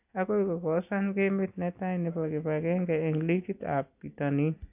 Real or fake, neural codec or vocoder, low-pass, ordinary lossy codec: real; none; 3.6 kHz; AAC, 32 kbps